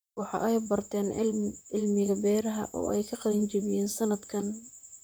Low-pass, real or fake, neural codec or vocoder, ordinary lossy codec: none; fake; vocoder, 44.1 kHz, 128 mel bands, Pupu-Vocoder; none